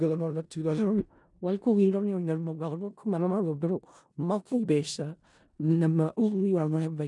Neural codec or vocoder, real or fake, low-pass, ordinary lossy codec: codec, 16 kHz in and 24 kHz out, 0.4 kbps, LongCat-Audio-Codec, four codebook decoder; fake; 10.8 kHz; none